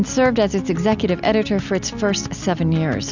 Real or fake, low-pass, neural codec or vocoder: real; 7.2 kHz; none